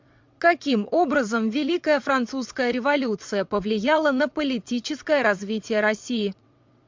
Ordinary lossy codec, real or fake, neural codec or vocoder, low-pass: MP3, 64 kbps; fake; vocoder, 22.05 kHz, 80 mel bands, WaveNeXt; 7.2 kHz